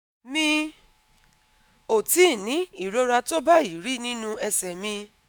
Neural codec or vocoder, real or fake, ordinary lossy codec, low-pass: autoencoder, 48 kHz, 128 numbers a frame, DAC-VAE, trained on Japanese speech; fake; none; none